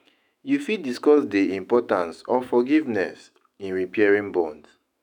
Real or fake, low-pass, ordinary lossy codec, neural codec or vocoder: fake; 19.8 kHz; none; autoencoder, 48 kHz, 128 numbers a frame, DAC-VAE, trained on Japanese speech